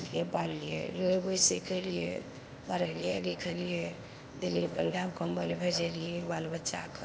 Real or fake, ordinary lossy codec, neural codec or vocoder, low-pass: fake; none; codec, 16 kHz, 0.8 kbps, ZipCodec; none